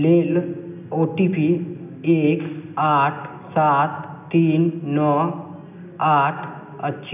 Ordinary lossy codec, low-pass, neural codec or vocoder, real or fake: none; 3.6 kHz; none; real